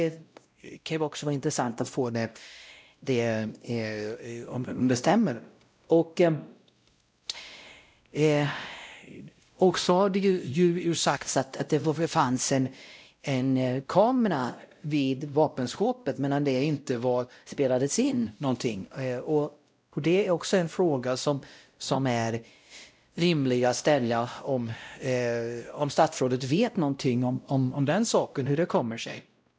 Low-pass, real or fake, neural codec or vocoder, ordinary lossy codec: none; fake; codec, 16 kHz, 0.5 kbps, X-Codec, WavLM features, trained on Multilingual LibriSpeech; none